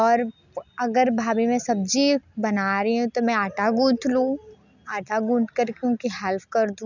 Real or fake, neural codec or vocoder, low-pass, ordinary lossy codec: real; none; 7.2 kHz; none